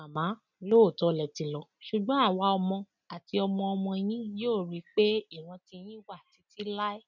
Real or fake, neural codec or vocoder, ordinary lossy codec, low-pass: real; none; none; 7.2 kHz